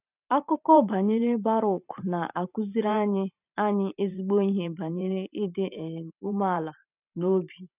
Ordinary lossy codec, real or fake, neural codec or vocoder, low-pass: none; fake; vocoder, 44.1 kHz, 128 mel bands every 512 samples, BigVGAN v2; 3.6 kHz